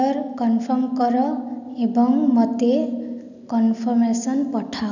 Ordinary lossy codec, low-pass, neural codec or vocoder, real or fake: none; 7.2 kHz; none; real